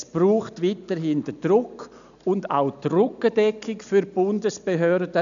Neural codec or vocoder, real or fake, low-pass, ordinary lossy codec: none; real; 7.2 kHz; none